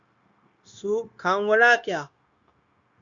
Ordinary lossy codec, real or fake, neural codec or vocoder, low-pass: Opus, 64 kbps; fake; codec, 16 kHz, 0.9 kbps, LongCat-Audio-Codec; 7.2 kHz